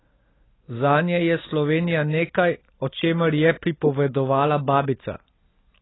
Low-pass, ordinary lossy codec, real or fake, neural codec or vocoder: 7.2 kHz; AAC, 16 kbps; real; none